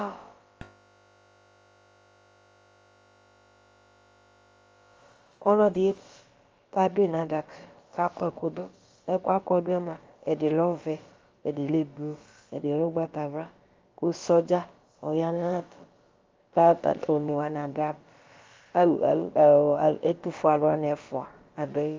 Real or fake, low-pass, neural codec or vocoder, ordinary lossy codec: fake; 7.2 kHz; codec, 16 kHz, about 1 kbps, DyCAST, with the encoder's durations; Opus, 24 kbps